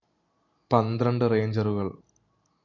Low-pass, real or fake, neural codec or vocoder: 7.2 kHz; real; none